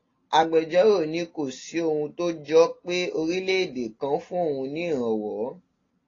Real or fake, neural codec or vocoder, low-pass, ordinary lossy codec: real; none; 7.2 kHz; AAC, 32 kbps